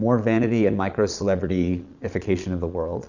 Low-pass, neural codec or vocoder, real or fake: 7.2 kHz; vocoder, 22.05 kHz, 80 mel bands, Vocos; fake